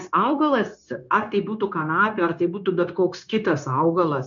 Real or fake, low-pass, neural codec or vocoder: fake; 7.2 kHz; codec, 16 kHz, 0.9 kbps, LongCat-Audio-Codec